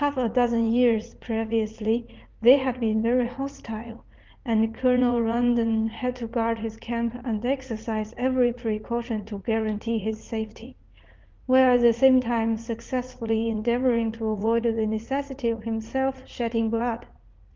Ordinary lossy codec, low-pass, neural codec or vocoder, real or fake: Opus, 32 kbps; 7.2 kHz; vocoder, 44.1 kHz, 80 mel bands, Vocos; fake